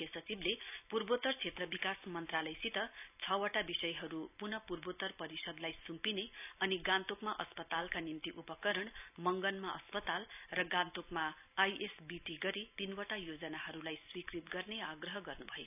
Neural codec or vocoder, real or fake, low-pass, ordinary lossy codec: none; real; 3.6 kHz; none